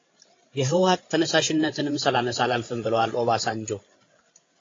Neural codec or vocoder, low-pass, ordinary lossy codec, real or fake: codec, 16 kHz, 8 kbps, FreqCodec, larger model; 7.2 kHz; AAC, 32 kbps; fake